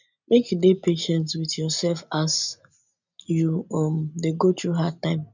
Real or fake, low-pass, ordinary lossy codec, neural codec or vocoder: real; 7.2 kHz; none; none